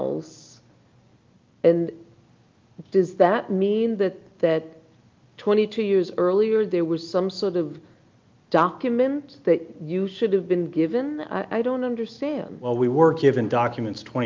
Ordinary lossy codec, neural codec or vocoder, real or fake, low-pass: Opus, 24 kbps; none; real; 7.2 kHz